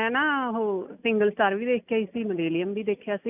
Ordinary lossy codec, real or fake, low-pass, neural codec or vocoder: none; real; 3.6 kHz; none